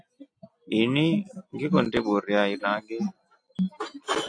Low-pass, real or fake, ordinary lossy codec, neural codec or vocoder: 9.9 kHz; real; AAC, 48 kbps; none